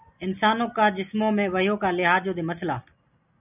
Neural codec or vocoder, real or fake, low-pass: none; real; 3.6 kHz